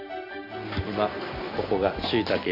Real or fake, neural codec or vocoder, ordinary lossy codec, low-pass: real; none; none; 5.4 kHz